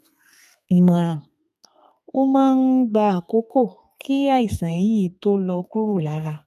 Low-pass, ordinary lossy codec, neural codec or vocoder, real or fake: 14.4 kHz; none; codec, 32 kHz, 1.9 kbps, SNAC; fake